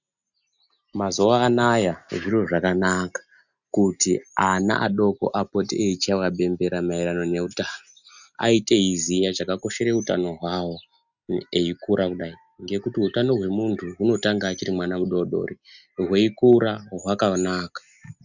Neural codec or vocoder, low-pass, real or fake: none; 7.2 kHz; real